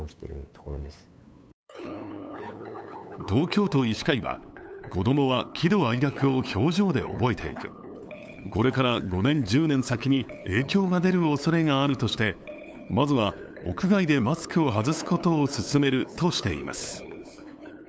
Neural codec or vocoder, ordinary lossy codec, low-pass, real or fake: codec, 16 kHz, 8 kbps, FunCodec, trained on LibriTTS, 25 frames a second; none; none; fake